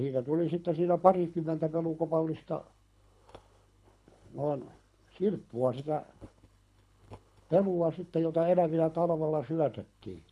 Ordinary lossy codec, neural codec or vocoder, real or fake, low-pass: none; codec, 24 kHz, 6 kbps, HILCodec; fake; none